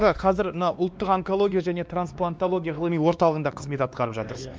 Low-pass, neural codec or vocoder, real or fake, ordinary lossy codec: none; codec, 16 kHz, 2 kbps, X-Codec, WavLM features, trained on Multilingual LibriSpeech; fake; none